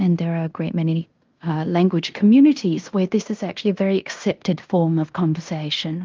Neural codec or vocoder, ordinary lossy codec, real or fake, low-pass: codec, 16 kHz in and 24 kHz out, 0.9 kbps, LongCat-Audio-Codec, fine tuned four codebook decoder; Opus, 24 kbps; fake; 7.2 kHz